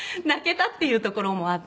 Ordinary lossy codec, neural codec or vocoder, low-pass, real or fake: none; none; none; real